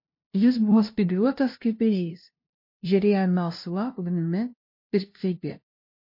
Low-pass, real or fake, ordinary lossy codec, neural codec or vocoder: 5.4 kHz; fake; MP3, 32 kbps; codec, 16 kHz, 0.5 kbps, FunCodec, trained on LibriTTS, 25 frames a second